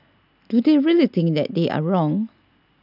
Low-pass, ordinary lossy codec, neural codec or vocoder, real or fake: 5.4 kHz; none; none; real